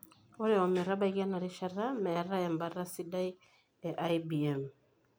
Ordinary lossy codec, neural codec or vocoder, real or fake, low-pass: none; none; real; none